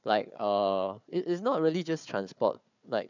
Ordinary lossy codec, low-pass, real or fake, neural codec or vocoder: none; 7.2 kHz; fake; codec, 16 kHz, 4 kbps, FunCodec, trained on Chinese and English, 50 frames a second